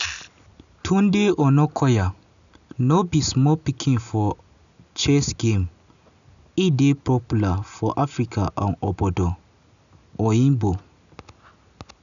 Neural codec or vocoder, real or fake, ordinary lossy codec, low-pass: none; real; none; 7.2 kHz